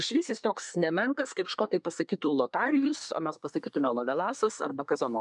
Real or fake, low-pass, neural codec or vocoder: fake; 10.8 kHz; codec, 24 kHz, 1 kbps, SNAC